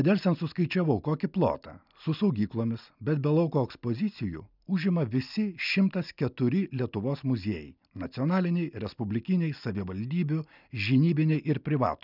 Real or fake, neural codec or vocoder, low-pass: real; none; 5.4 kHz